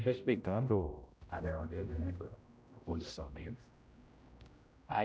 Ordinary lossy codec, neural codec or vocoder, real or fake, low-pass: none; codec, 16 kHz, 0.5 kbps, X-Codec, HuBERT features, trained on general audio; fake; none